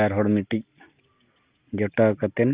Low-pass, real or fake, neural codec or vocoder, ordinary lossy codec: 3.6 kHz; real; none; Opus, 32 kbps